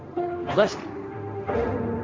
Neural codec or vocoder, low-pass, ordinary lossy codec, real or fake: codec, 16 kHz, 1.1 kbps, Voila-Tokenizer; none; none; fake